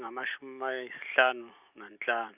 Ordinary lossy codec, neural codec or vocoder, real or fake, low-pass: none; none; real; 3.6 kHz